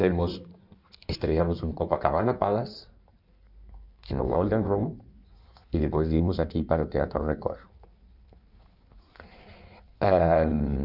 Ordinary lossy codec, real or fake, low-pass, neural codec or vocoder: none; fake; 5.4 kHz; codec, 16 kHz in and 24 kHz out, 1.1 kbps, FireRedTTS-2 codec